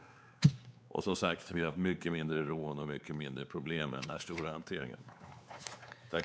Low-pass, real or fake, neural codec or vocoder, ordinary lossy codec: none; fake; codec, 16 kHz, 4 kbps, X-Codec, WavLM features, trained on Multilingual LibriSpeech; none